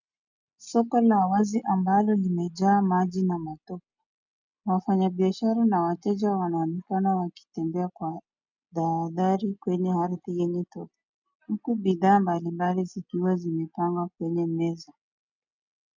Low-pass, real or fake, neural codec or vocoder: 7.2 kHz; real; none